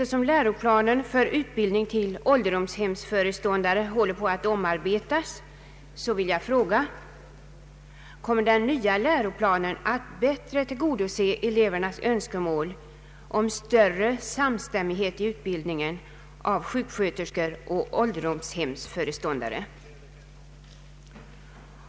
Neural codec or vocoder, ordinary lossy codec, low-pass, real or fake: none; none; none; real